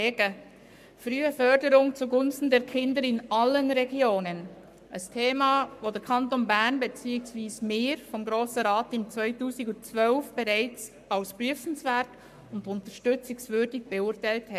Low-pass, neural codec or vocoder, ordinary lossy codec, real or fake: 14.4 kHz; codec, 44.1 kHz, 7.8 kbps, Pupu-Codec; MP3, 96 kbps; fake